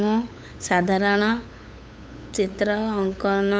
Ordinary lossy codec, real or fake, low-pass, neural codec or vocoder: none; fake; none; codec, 16 kHz, 8 kbps, FunCodec, trained on LibriTTS, 25 frames a second